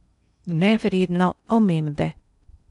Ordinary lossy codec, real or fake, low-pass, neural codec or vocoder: none; fake; 10.8 kHz; codec, 16 kHz in and 24 kHz out, 0.8 kbps, FocalCodec, streaming, 65536 codes